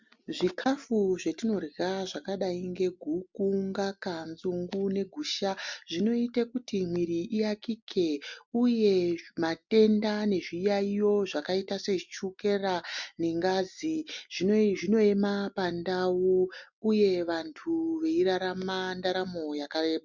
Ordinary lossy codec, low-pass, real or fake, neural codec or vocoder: MP3, 64 kbps; 7.2 kHz; real; none